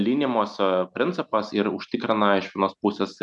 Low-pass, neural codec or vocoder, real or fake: 10.8 kHz; none; real